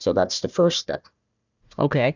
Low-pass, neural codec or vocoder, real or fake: 7.2 kHz; codec, 16 kHz, 1 kbps, FunCodec, trained on Chinese and English, 50 frames a second; fake